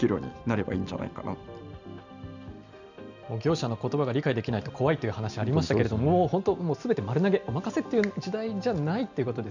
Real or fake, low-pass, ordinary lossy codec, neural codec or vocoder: real; 7.2 kHz; none; none